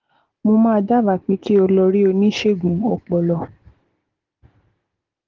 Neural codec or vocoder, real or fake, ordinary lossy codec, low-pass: none; real; Opus, 16 kbps; 7.2 kHz